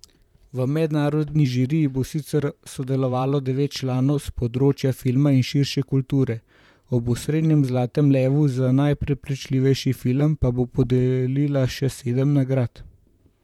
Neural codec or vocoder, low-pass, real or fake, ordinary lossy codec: vocoder, 44.1 kHz, 128 mel bands, Pupu-Vocoder; 19.8 kHz; fake; none